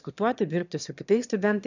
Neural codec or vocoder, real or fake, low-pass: autoencoder, 22.05 kHz, a latent of 192 numbers a frame, VITS, trained on one speaker; fake; 7.2 kHz